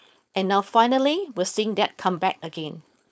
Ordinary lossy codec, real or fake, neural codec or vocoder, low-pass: none; fake; codec, 16 kHz, 4.8 kbps, FACodec; none